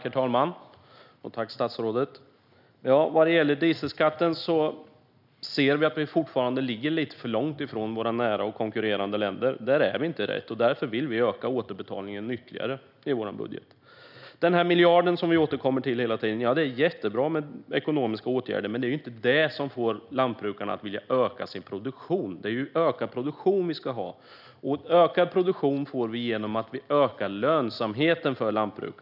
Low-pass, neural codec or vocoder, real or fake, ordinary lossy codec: 5.4 kHz; none; real; none